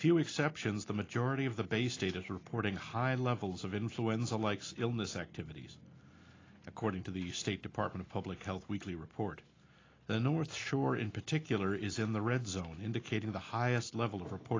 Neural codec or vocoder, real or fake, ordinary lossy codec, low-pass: none; real; AAC, 32 kbps; 7.2 kHz